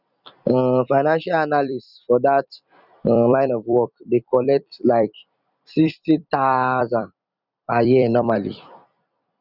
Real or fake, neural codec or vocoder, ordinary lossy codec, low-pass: fake; vocoder, 44.1 kHz, 128 mel bands every 256 samples, BigVGAN v2; none; 5.4 kHz